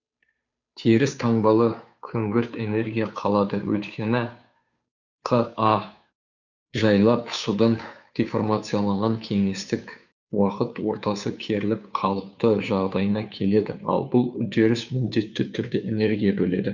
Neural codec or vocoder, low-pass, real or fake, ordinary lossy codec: codec, 16 kHz, 2 kbps, FunCodec, trained on Chinese and English, 25 frames a second; 7.2 kHz; fake; none